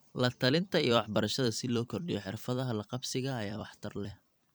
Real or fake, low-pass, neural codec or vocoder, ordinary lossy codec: fake; none; vocoder, 44.1 kHz, 128 mel bands every 256 samples, BigVGAN v2; none